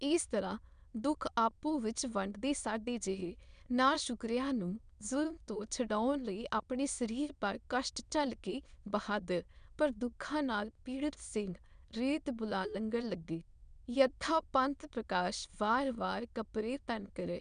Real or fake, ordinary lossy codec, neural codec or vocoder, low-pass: fake; none; autoencoder, 22.05 kHz, a latent of 192 numbers a frame, VITS, trained on many speakers; 9.9 kHz